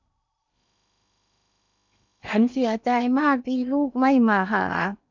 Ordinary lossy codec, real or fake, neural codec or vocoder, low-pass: none; fake; codec, 16 kHz in and 24 kHz out, 0.8 kbps, FocalCodec, streaming, 65536 codes; 7.2 kHz